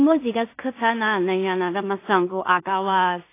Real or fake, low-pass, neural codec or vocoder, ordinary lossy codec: fake; 3.6 kHz; codec, 16 kHz in and 24 kHz out, 0.4 kbps, LongCat-Audio-Codec, two codebook decoder; AAC, 24 kbps